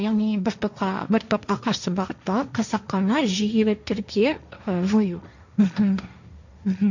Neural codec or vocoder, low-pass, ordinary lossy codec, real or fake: codec, 16 kHz, 1.1 kbps, Voila-Tokenizer; 7.2 kHz; none; fake